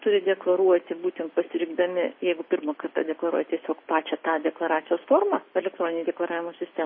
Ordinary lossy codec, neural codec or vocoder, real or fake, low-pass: MP3, 24 kbps; none; real; 5.4 kHz